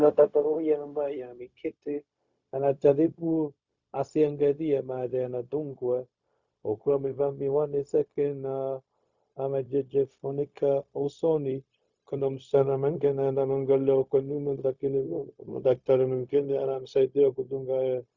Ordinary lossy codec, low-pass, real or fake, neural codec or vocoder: Opus, 64 kbps; 7.2 kHz; fake; codec, 16 kHz, 0.4 kbps, LongCat-Audio-Codec